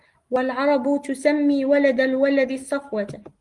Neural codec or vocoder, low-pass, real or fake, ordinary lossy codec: none; 10.8 kHz; real; Opus, 24 kbps